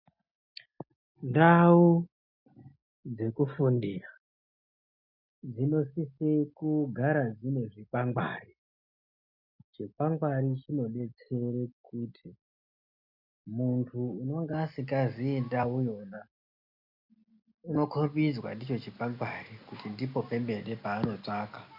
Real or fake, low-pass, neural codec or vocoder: real; 5.4 kHz; none